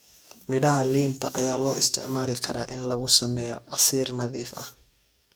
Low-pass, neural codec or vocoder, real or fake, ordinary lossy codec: none; codec, 44.1 kHz, 2.6 kbps, DAC; fake; none